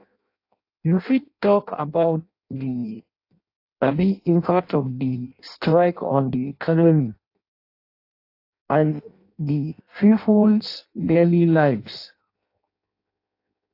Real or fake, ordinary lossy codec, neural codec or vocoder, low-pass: fake; AAC, 32 kbps; codec, 16 kHz in and 24 kHz out, 0.6 kbps, FireRedTTS-2 codec; 5.4 kHz